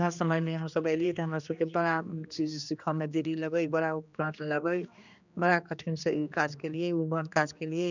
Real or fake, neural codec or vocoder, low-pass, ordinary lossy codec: fake; codec, 16 kHz, 2 kbps, X-Codec, HuBERT features, trained on general audio; 7.2 kHz; none